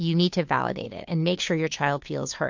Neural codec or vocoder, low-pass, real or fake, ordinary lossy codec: codec, 16 kHz, 2 kbps, FunCodec, trained on Chinese and English, 25 frames a second; 7.2 kHz; fake; MP3, 48 kbps